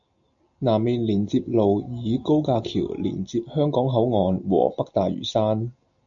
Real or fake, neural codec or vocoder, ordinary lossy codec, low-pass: real; none; MP3, 96 kbps; 7.2 kHz